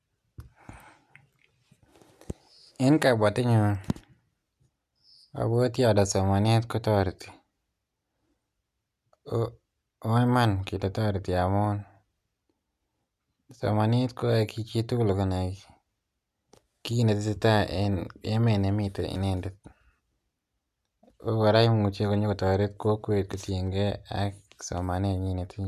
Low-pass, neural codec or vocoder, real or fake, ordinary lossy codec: 14.4 kHz; none; real; none